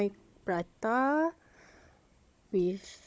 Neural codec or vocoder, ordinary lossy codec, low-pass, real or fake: codec, 16 kHz, 16 kbps, FunCodec, trained on Chinese and English, 50 frames a second; none; none; fake